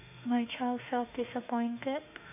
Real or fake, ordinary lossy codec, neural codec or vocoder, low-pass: fake; AAC, 32 kbps; autoencoder, 48 kHz, 32 numbers a frame, DAC-VAE, trained on Japanese speech; 3.6 kHz